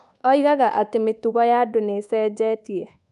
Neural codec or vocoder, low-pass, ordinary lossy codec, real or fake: codec, 24 kHz, 1.2 kbps, DualCodec; 10.8 kHz; none; fake